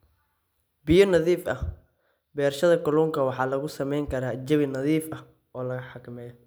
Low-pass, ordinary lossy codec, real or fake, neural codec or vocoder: none; none; real; none